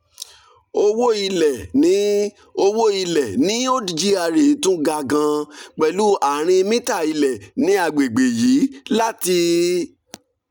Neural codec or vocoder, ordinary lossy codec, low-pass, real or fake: none; none; 19.8 kHz; real